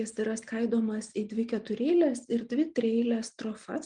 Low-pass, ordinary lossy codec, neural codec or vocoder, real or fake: 9.9 kHz; Opus, 32 kbps; none; real